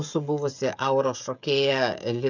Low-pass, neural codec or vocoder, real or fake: 7.2 kHz; codec, 16 kHz, 16 kbps, FreqCodec, smaller model; fake